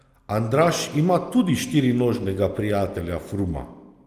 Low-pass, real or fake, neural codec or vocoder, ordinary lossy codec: 14.4 kHz; real; none; Opus, 32 kbps